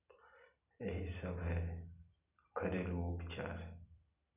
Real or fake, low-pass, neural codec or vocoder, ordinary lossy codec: real; 3.6 kHz; none; none